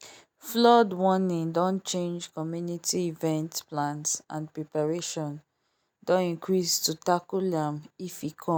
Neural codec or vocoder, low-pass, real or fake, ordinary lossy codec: none; none; real; none